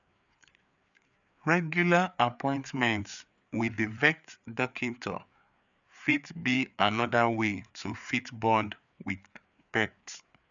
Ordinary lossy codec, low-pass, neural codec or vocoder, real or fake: none; 7.2 kHz; codec, 16 kHz, 4 kbps, FreqCodec, larger model; fake